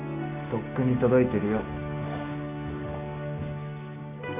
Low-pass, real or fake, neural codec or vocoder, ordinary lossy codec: 3.6 kHz; fake; codec, 16 kHz, 6 kbps, DAC; none